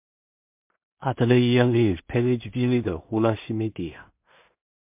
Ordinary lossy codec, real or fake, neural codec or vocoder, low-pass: MP3, 32 kbps; fake; codec, 16 kHz in and 24 kHz out, 0.4 kbps, LongCat-Audio-Codec, two codebook decoder; 3.6 kHz